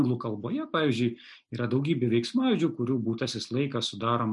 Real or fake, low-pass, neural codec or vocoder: real; 10.8 kHz; none